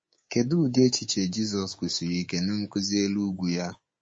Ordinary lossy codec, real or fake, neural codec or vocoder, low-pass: MP3, 32 kbps; fake; codec, 24 kHz, 3.1 kbps, DualCodec; 9.9 kHz